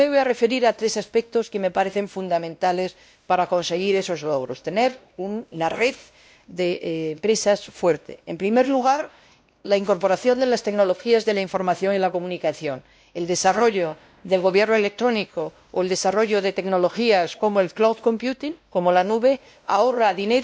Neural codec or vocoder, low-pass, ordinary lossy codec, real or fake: codec, 16 kHz, 1 kbps, X-Codec, WavLM features, trained on Multilingual LibriSpeech; none; none; fake